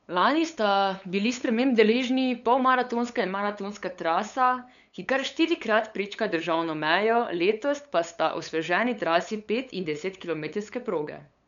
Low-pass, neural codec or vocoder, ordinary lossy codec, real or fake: 7.2 kHz; codec, 16 kHz, 8 kbps, FunCodec, trained on LibriTTS, 25 frames a second; none; fake